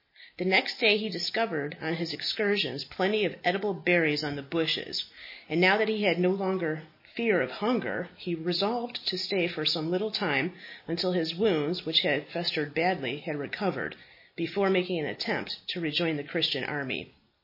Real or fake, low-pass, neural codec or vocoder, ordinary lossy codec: real; 5.4 kHz; none; MP3, 24 kbps